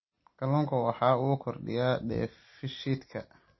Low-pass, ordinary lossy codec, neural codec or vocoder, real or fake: 7.2 kHz; MP3, 24 kbps; none; real